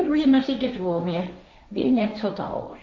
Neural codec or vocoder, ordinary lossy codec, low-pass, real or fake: codec, 16 kHz, 1.1 kbps, Voila-Tokenizer; none; none; fake